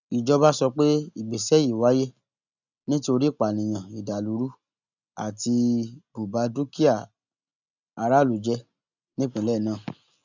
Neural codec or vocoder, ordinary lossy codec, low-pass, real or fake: none; none; 7.2 kHz; real